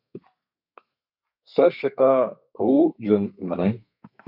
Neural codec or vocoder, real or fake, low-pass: codec, 32 kHz, 1.9 kbps, SNAC; fake; 5.4 kHz